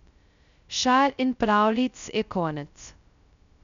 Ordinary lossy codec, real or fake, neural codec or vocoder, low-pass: none; fake; codec, 16 kHz, 0.2 kbps, FocalCodec; 7.2 kHz